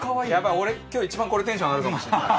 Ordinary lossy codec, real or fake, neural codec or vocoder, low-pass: none; real; none; none